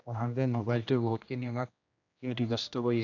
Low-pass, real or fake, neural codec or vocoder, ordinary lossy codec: 7.2 kHz; fake; codec, 16 kHz, 1 kbps, X-Codec, HuBERT features, trained on general audio; none